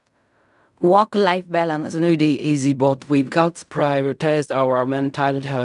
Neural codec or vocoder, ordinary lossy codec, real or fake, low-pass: codec, 16 kHz in and 24 kHz out, 0.4 kbps, LongCat-Audio-Codec, fine tuned four codebook decoder; none; fake; 10.8 kHz